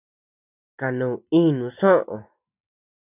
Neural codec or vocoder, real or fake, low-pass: none; real; 3.6 kHz